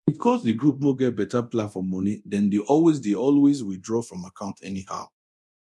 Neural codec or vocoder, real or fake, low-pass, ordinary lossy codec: codec, 24 kHz, 0.9 kbps, DualCodec; fake; none; none